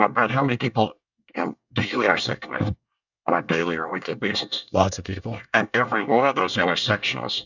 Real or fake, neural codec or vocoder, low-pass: fake; codec, 24 kHz, 1 kbps, SNAC; 7.2 kHz